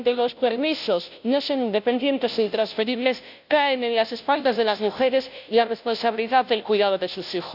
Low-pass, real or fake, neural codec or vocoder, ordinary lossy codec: 5.4 kHz; fake; codec, 16 kHz, 0.5 kbps, FunCodec, trained on Chinese and English, 25 frames a second; none